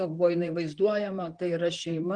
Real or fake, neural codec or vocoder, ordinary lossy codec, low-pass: fake; vocoder, 44.1 kHz, 128 mel bands, Pupu-Vocoder; Opus, 24 kbps; 9.9 kHz